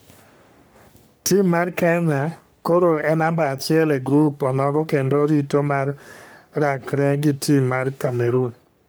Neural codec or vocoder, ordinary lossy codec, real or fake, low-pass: codec, 44.1 kHz, 3.4 kbps, Pupu-Codec; none; fake; none